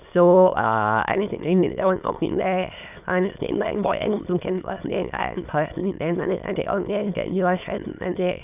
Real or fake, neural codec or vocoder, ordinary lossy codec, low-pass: fake; autoencoder, 22.05 kHz, a latent of 192 numbers a frame, VITS, trained on many speakers; none; 3.6 kHz